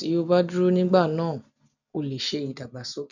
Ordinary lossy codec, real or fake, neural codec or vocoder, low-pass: none; real; none; 7.2 kHz